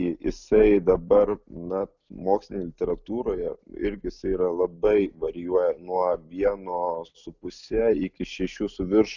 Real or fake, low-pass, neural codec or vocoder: real; 7.2 kHz; none